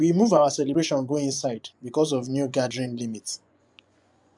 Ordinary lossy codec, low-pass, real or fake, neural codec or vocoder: none; 10.8 kHz; fake; vocoder, 24 kHz, 100 mel bands, Vocos